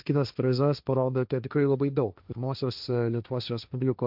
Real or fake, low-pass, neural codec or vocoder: fake; 5.4 kHz; codec, 16 kHz, 1.1 kbps, Voila-Tokenizer